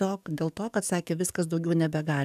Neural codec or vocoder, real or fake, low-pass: codec, 44.1 kHz, 7.8 kbps, DAC; fake; 14.4 kHz